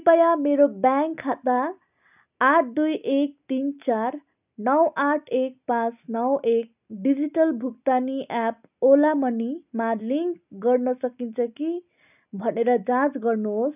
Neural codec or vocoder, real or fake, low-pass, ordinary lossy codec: none; real; 3.6 kHz; none